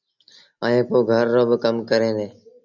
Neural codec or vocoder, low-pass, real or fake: none; 7.2 kHz; real